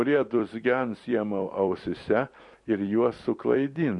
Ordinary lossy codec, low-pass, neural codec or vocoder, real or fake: MP3, 48 kbps; 10.8 kHz; vocoder, 44.1 kHz, 128 mel bands every 256 samples, BigVGAN v2; fake